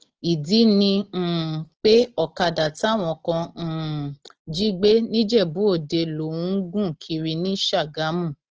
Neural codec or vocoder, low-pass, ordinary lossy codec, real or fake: none; 7.2 kHz; Opus, 16 kbps; real